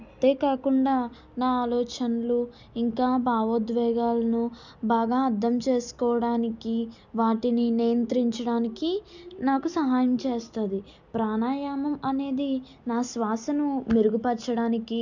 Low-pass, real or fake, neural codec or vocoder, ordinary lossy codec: 7.2 kHz; real; none; none